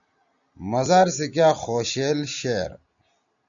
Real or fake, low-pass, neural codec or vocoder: real; 7.2 kHz; none